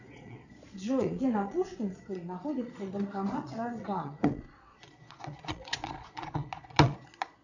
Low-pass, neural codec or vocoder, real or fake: 7.2 kHz; vocoder, 22.05 kHz, 80 mel bands, Vocos; fake